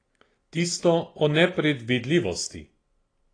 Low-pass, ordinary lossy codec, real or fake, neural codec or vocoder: 9.9 kHz; AAC, 32 kbps; real; none